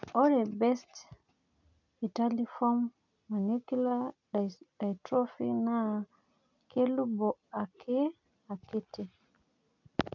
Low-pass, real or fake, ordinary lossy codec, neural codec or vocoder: 7.2 kHz; real; none; none